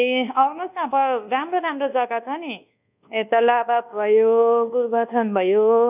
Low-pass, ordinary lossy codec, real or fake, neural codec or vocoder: 3.6 kHz; none; fake; codec, 24 kHz, 1.2 kbps, DualCodec